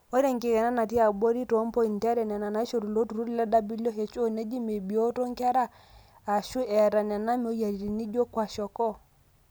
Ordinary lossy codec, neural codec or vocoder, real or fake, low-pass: none; none; real; none